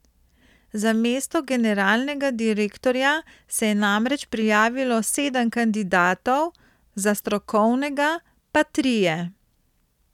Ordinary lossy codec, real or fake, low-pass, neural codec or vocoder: none; real; 19.8 kHz; none